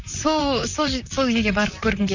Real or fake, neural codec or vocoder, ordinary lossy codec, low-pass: fake; vocoder, 44.1 kHz, 128 mel bands, Pupu-Vocoder; none; 7.2 kHz